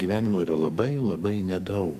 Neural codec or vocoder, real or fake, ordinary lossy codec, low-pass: codec, 44.1 kHz, 2.6 kbps, SNAC; fake; MP3, 96 kbps; 14.4 kHz